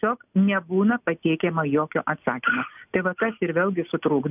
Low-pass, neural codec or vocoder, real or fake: 3.6 kHz; none; real